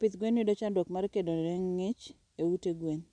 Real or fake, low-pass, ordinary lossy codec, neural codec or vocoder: real; 9.9 kHz; none; none